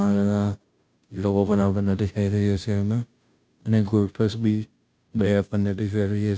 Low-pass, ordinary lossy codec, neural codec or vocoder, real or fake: none; none; codec, 16 kHz, 0.5 kbps, FunCodec, trained on Chinese and English, 25 frames a second; fake